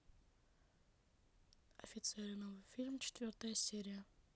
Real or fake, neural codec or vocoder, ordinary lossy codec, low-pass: real; none; none; none